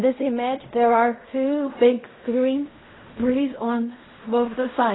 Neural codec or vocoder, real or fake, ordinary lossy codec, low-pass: codec, 16 kHz in and 24 kHz out, 0.4 kbps, LongCat-Audio-Codec, fine tuned four codebook decoder; fake; AAC, 16 kbps; 7.2 kHz